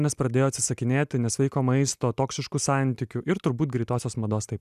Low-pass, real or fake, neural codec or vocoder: 14.4 kHz; real; none